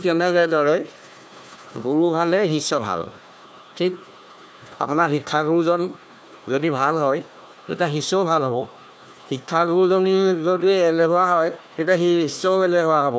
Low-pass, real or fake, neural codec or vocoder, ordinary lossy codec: none; fake; codec, 16 kHz, 1 kbps, FunCodec, trained on Chinese and English, 50 frames a second; none